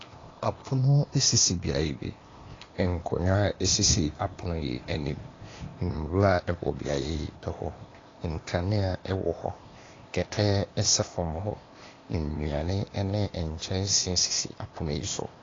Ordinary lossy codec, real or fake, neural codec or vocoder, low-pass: AAC, 32 kbps; fake; codec, 16 kHz, 0.8 kbps, ZipCodec; 7.2 kHz